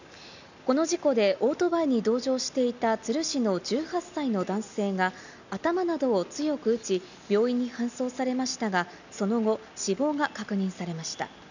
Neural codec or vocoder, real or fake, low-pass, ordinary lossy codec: none; real; 7.2 kHz; none